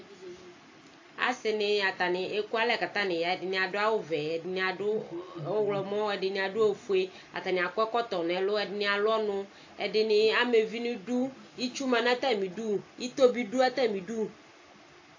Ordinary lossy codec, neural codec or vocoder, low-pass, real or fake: AAC, 48 kbps; none; 7.2 kHz; real